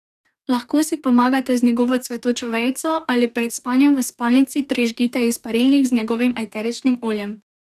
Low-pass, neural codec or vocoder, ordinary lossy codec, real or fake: 14.4 kHz; codec, 44.1 kHz, 2.6 kbps, DAC; none; fake